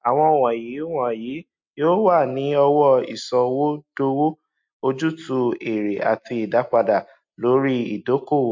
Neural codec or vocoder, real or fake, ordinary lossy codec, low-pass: none; real; MP3, 48 kbps; 7.2 kHz